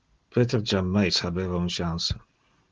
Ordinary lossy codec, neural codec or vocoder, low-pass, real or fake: Opus, 16 kbps; none; 7.2 kHz; real